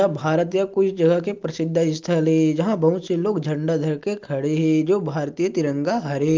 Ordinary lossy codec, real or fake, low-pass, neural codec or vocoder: Opus, 24 kbps; real; 7.2 kHz; none